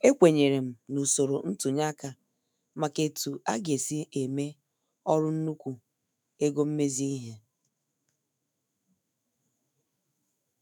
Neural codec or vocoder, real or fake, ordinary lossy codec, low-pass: autoencoder, 48 kHz, 128 numbers a frame, DAC-VAE, trained on Japanese speech; fake; none; none